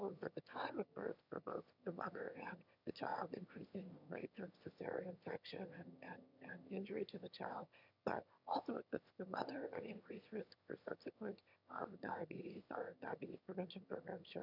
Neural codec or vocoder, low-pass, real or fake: autoencoder, 22.05 kHz, a latent of 192 numbers a frame, VITS, trained on one speaker; 5.4 kHz; fake